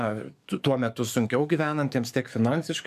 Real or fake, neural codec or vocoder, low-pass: fake; codec, 44.1 kHz, 7.8 kbps, DAC; 14.4 kHz